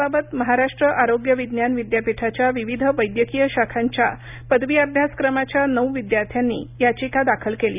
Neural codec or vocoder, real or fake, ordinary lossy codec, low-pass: none; real; none; 3.6 kHz